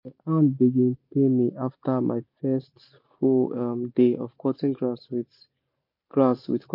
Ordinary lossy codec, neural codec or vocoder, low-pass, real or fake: MP3, 32 kbps; none; 5.4 kHz; real